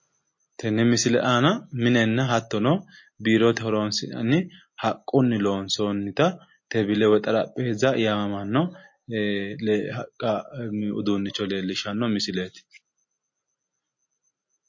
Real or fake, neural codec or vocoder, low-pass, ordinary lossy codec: real; none; 7.2 kHz; MP3, 32 kbps